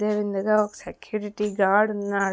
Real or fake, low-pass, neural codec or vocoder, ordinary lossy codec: real; none; none; none